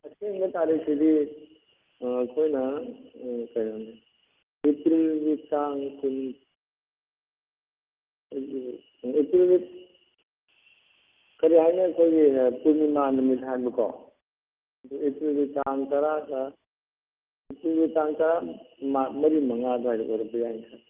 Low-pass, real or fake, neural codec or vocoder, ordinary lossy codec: 3.6 kHz; real; none; Opus, 32 kbps